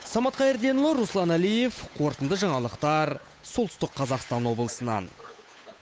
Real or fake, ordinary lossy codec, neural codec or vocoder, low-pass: fake; none; codec, 16 kHz, 8 kbps, FunCodec, trained on Chinese and English, 25 frames a second; none